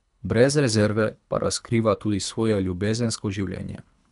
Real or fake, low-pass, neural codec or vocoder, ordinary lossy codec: fake; 10.8 kHz; codec, 24 kHz, 3 kbps, HILCodec; none